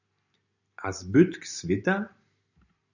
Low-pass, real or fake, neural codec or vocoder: 7.2 kHz; real; none